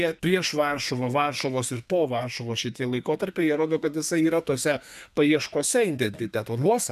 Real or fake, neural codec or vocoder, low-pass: fake; codec, 32 kHz, 1.9 kbps, SNAC; 14.4 kHz